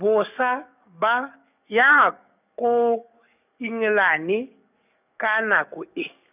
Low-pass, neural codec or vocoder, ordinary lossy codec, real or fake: 3.6 kHz; codec, 44.1 kHz, 7.8 kbps, DAC; none; fake